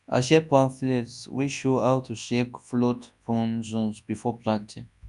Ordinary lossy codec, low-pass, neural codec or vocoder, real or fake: none; 10.8 kHz; codec, 24 kHz, 0.9 kbps, WavTokenizer, large speech release; fake